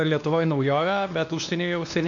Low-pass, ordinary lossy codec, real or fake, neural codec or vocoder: 7.2 kHz; AAC, 64 kbps; fake; codec, 16 kHz, 2 kbps, X-Codec, WavLM features, trained on Multilingual LibriSpeech